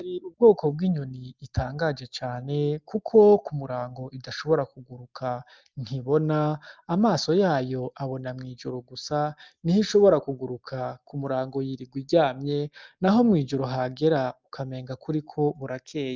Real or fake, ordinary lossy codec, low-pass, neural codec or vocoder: real; Opus, 24 kbps; 7.2 kHz; none